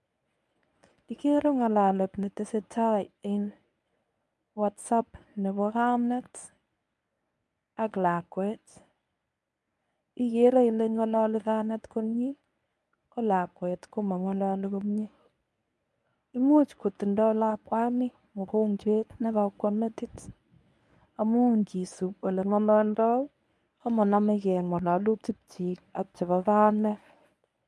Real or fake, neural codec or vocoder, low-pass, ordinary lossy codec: fake; codec, 24 kHz, 0.9 kbps, WavTokenizer, medium speech release version 1; none; none